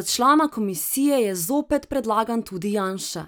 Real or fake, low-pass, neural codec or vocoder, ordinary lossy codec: real; none; none; none